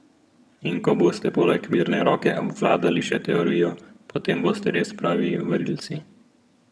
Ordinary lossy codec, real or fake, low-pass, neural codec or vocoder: none; fake; none; vocoder, 22.05 kHz, 80 mel bands, HiFi-GAN